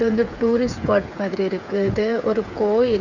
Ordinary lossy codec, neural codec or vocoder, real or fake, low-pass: none; codec, 16 kHz, 8 kbps, FreqCodec, smaller model; fake; 7.2 kHz